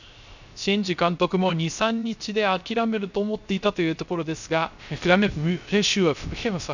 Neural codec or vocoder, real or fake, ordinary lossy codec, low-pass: codec, 16 kHz, 0.3 kbps, FocalCodec; fake; none; 7.2 kHz